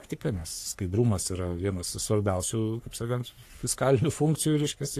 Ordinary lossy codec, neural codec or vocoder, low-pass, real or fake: AAC, 64 kbps; codec, 44.1 kHz, 3.4 kbps, Pupu-Codec; 14.4 kHz; fake